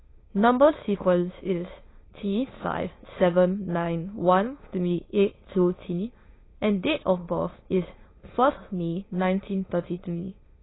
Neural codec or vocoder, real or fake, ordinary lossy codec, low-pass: autoencoder, 22.05 kHz, a latent of 192 numbers a frame, VITS, trained on many speakers; fake; AAC, 16 kbps; 7.2 kHz